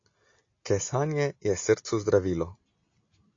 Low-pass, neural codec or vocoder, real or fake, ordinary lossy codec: 7.2 kHz; none; real; AAC, 48 kbps